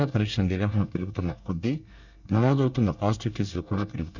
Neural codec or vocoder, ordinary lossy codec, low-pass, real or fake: codec, 24 kHz, 1 kbps, SNAC; none; 7.2 kHz; fake